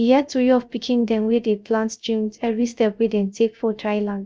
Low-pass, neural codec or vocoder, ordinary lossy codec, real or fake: none; codec, 16 kHz, 0.3 kbps, FocalCodec; none; fake